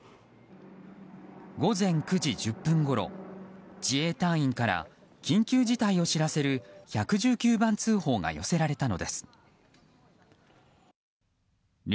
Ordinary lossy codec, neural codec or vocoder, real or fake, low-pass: none; none; real; none